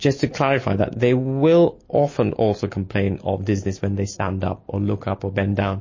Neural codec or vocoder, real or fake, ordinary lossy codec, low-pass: none; real; MP3, 32 kbps; 7.2 kHz